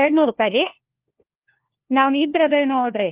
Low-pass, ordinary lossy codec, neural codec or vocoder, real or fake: 3.6 kHz; Opus, 16 kbps; codec, 16 kHz, 1 kbps, FunCodec, trained on LibriTTS, 50 frames a second; fake